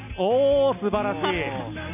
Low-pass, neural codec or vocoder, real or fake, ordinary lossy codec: 3.6 kHz; none; real; none